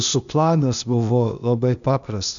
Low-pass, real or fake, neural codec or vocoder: 7.2 kHz; fake; codec, 16 kHz, 0.8 kbps, ZipCodec